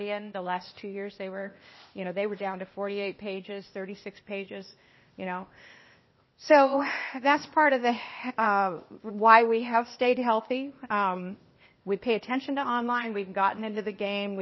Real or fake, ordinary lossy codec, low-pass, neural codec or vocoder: fake; MP3, 24 kbps; 7.2 kHz; codec, 16 kHz, 0.8 kbps, ZipCodec